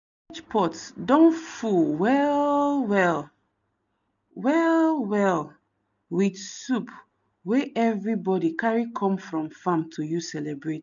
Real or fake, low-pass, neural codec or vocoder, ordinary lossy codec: real; 7.2 kHz; none; none